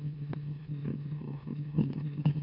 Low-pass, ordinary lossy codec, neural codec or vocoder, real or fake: 5.4 kHz; none; autoencoder, 44.1 kHz, a latent of 192 numbers a frame, MeloTTS; fake